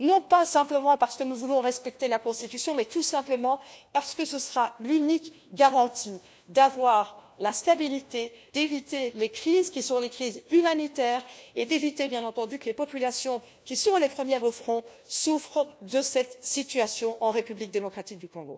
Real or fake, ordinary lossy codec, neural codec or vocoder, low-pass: fake; none; codec, 16 kHz, 1 kbps, FunCodec, trained on LibriTTS, 50 frames a second; none